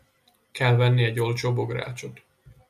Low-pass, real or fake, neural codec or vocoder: 14.4 kHz; real; none